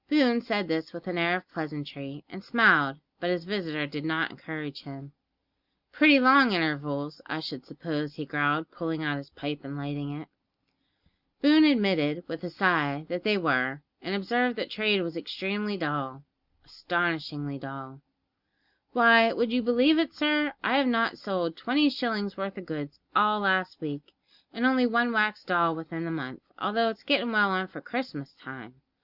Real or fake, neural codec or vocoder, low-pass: real; none; 5.4 kHz